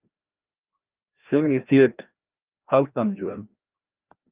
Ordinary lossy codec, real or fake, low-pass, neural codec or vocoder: Opus, 24 kbps; fake; 3.6 kHz; codec, 16 kHz, 1 kbps, FreqCodec, larger model